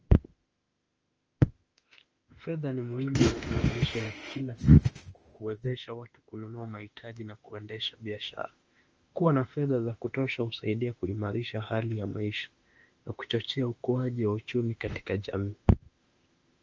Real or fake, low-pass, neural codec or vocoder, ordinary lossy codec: fake; 7.2 kHz; autoencoder, 48 kHz, 32 numbers a frame, DAC-VAE, trained on Japanese speech; Opus, 24 kbps